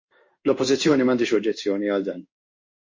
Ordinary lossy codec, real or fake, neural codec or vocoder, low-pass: MP3, 32 kbps; fake; codec, 16 kHz in and 24 kHz out, 1 kbps, XY-Tokenizer; 7.2 kHz